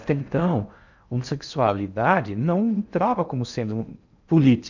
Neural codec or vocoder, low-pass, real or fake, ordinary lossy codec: codec, 16 kHz in and 24 kHz out, 0.6 kbps, FocalCodec, streaming, 4096 codes; 7.2 kHz; fake; none